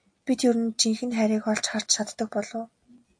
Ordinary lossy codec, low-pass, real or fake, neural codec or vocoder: AAC, 64 kbps; 9.9 kHz; real; none